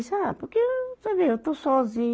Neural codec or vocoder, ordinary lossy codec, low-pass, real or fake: none; none; none; real